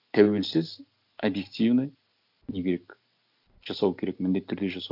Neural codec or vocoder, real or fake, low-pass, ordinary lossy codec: none; real; 5.4 kHz; none